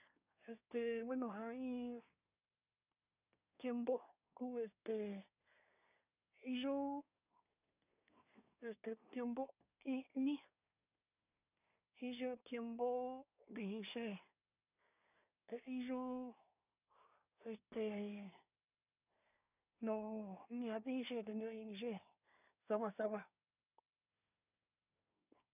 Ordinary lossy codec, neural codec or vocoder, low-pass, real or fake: none; codec, 24 kHz, 1 kbps, SNAC; 3.6 kHz; fake